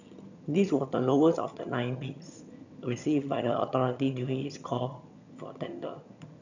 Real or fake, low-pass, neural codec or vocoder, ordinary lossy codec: fake; 7.2 kHz; vocoder, 22.05 kHz, 80 mel bands, HiFi-GAN; none